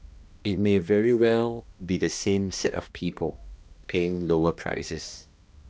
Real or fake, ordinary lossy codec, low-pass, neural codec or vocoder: fake; none; none; codec, 16 kHz, 1 kbps, X-Codec, HuBERT features, trained on balanced general audio